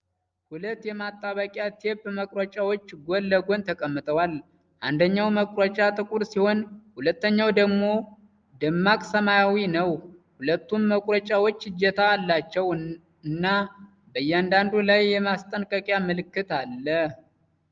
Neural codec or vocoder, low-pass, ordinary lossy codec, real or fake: none; 7.2 kHz; Opus, 24 kbps; real